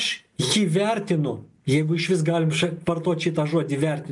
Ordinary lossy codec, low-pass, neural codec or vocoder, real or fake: MP3, 64 kbps; 10.8 kHz; none; real